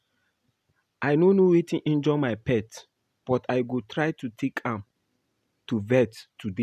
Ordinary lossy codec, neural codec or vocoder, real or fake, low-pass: none; none; real; 14.4 kHz